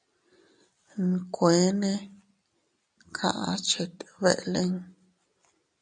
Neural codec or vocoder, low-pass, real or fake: none; 9.9 kHz; real